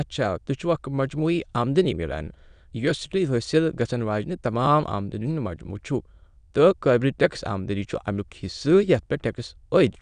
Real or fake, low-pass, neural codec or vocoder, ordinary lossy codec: fake; 9.9 kHz; autoencoder, 22.05 kHz, a latent of 192 numbers a frame, VITS, trained on many speakers; none